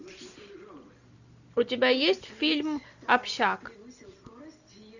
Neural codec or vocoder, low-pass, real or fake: none; 7.2 kHz; real